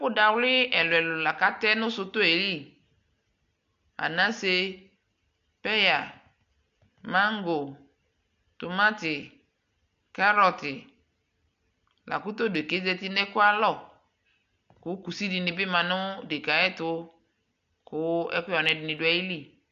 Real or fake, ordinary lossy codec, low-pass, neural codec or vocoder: real; AAC, 64 kbps; 7.2 kHz; none